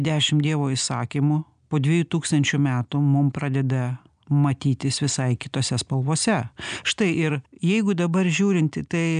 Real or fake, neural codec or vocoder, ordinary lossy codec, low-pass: real; none; MP3, 96 kbps; 9.9 kHz